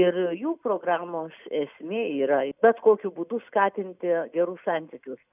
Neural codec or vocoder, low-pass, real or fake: none; 3.6 kHz; real